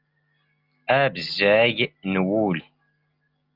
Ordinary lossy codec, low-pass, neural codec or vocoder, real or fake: Opus, 32 kbps; 5.4 kHz; none; real